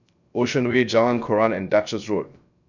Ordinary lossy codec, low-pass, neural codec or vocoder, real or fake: none; 7.2 kHz; codec, 16 kHz, 0.3 kbps, FocalCodec; fake